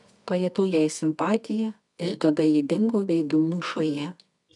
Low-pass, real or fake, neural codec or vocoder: 10.8 kHz; fake; codec, 24 kHz, 0.9 kbps, WavTokenizer, medium music audio release